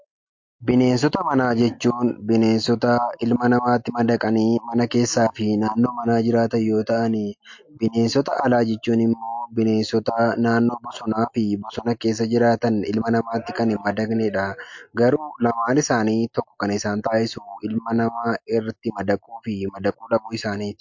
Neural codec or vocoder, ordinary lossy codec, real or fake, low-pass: none; MP3, 48 kbps; real; 7.2 kHz